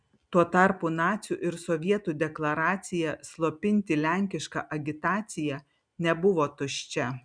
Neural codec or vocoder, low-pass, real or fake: none; 9.9 kHz; real